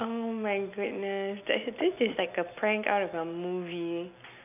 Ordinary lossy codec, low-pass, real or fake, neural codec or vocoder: none; 3.6 kHz; real; none